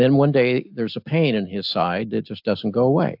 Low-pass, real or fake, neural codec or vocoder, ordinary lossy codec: 5.4 kHz; real; none; AAC, 48 kbps